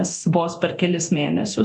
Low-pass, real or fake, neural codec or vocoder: 10.8 kHz; fake; codec, 24 kHz, 0.9 kbps, DualCodec